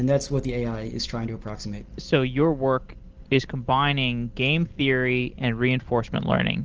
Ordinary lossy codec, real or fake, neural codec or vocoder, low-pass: Opus, 16 kbps; real; none; 7.2 kHz